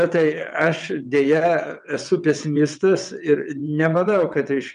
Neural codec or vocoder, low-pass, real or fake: vocoder, 22.05 kHz, 80 mel bands, WaveNeXt; 9.9 kHz; fake